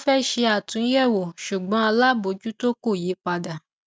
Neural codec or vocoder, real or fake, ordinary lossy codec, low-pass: none; real; none; none